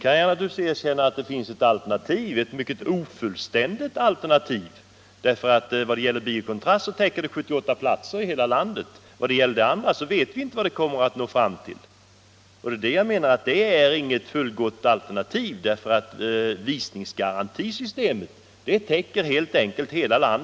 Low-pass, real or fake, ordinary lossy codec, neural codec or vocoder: none; real; none; none